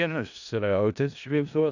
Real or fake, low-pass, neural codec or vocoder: fake; 7.2 kHz; codec, 16 kHz in and 24 kHz out, 0.4 kbps, LongCat-Audio-Codec, four codebook decoder